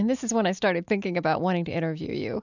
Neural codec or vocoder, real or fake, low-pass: none; real; 7.2 kHz